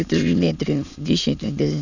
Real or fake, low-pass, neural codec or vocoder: fake; 7.2 kHz; autoencoder, 22.05 kHz, a latent of 192 numbers a frame, VITS, trained on many speakers